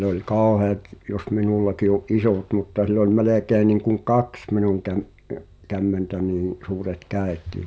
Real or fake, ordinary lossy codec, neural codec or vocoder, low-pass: real; none; none; none